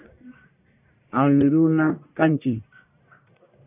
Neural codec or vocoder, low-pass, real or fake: codec, 44.1 kHz, 1.7 kbps, Pupu-Codec; 3.6 kHz; fake